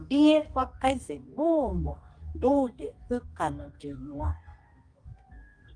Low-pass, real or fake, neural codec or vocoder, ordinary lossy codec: 9.9 kHz; fake; codec, 24 kHz, 0.9 kbps, WavTokenizer, medium music audio release; Opus, 32 kbps